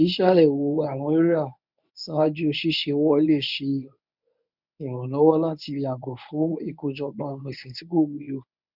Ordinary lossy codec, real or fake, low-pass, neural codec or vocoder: none; fake; 5.4 kHz; codec, 24 kHz, 0.9 kbps, WavTokenizer, medium speech release version 1